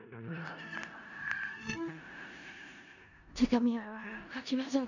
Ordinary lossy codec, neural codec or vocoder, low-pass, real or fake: none; codec, 16 kHz in and 24 kHz out, 0.4 kbps, LongCat-Audio-Codec, four codebook decoder; 7.2 kHz; fake